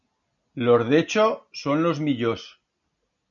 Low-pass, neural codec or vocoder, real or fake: 7.2 kHz; none; real